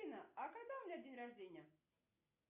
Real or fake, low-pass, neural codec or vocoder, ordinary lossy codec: real; 3.6 kHz; none; Opus, 64 kbps